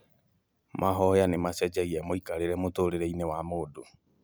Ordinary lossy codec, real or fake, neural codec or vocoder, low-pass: none; real; none; none